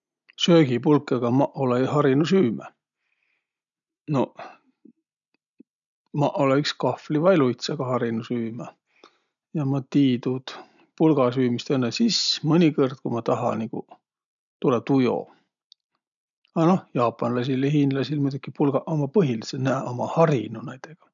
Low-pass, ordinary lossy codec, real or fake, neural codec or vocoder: 7.2 kHz; none; real; none